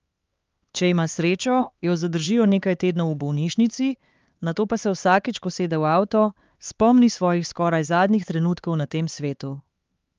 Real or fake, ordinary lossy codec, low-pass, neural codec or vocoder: fake; Opus, 32 kbps; 7.2 kHz; codec, 16 kHz, 4 kbps, X-Codec, HuBERT features, trained on LibriSpeech